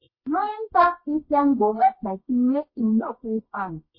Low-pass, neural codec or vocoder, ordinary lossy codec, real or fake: 5.4 kHz; codec, 24 kHz, 0.9 kbps, WavTokenizer, medium music audio release; MP3, 24 kbps; fake